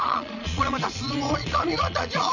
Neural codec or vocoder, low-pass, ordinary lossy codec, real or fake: vocoder, 22.05 kHz, 80 mel bands, Vocos; 7.2 kHz; none; fake